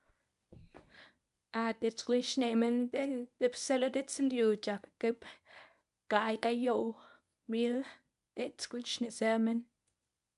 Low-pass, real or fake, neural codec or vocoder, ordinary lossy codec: 10.8 kHz; fake; codec, 24 kHz, 0.9 kbps, WavTokenizer, medium speech release version 1; none